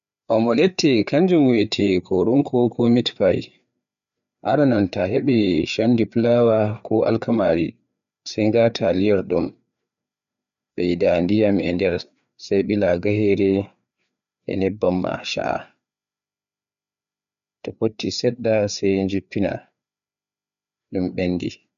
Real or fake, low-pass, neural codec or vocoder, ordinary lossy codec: fake; 7.2 kHz; codec, 16 kHz, 4 kbps, FreqCodec, larger model; none